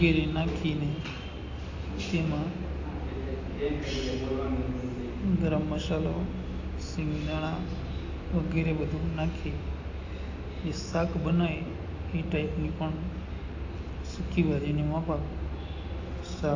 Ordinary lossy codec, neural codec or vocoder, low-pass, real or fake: AAC, 48 kbps; none; 7.2 kHz; real